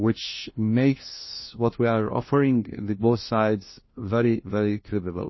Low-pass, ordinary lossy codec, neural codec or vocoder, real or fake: 7.2 kHz; MP3, 24 kbps; codec, 16 kHz, 1 kbps, FunCodec, trained on LibriTTS, 50 frames a second; fake